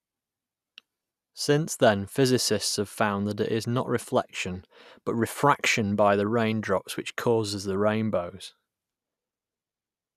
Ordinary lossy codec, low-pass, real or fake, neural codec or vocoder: none; 14.4 kHz; real; none